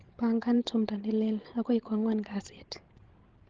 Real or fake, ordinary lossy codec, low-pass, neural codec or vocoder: fake; Opus, 16 kbps; 7.2 kHz; codec, 16 kHz, 16 kbps, FreqCodec, larger model